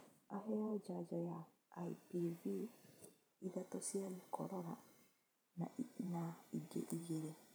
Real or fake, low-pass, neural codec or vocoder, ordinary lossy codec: fake; none; vocoder, 44.1 kHz, 128 mel bands every 512 samples, BigVGAN v2; none